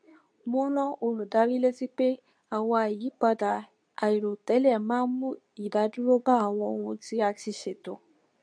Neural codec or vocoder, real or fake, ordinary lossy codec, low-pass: codec, 24 kHz, 0.9 kbps, WavTokenizer, medium speech release version 2; fake; none; 9.9 kHz